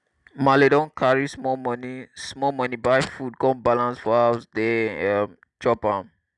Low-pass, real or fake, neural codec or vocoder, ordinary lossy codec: 10.8 kHz; real; none; none